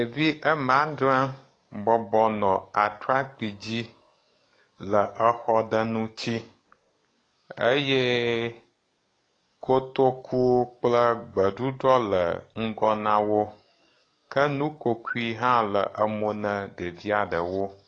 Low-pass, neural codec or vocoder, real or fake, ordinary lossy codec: 9.9 kHz; codec, 44.1 kHz, 7.8 kbps, DAC; fake; AAC, 32 kbps